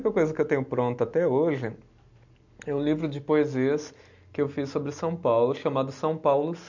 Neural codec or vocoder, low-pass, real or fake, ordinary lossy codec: none; 7.2 kHz; real; none